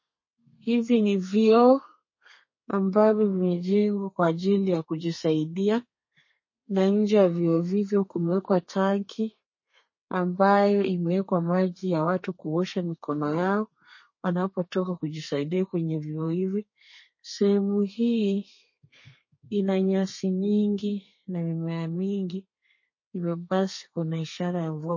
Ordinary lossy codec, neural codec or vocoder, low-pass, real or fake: MP3, 32 kbps; codec, 32 kHz, 1.9 kbps, SNAC; 7.2 kHz; fake